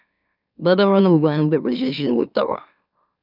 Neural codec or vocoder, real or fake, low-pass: autoencoder, 44.1 kHz, a latent of 192 numbers a frame, MeloTTS; fake; 5.4 kHz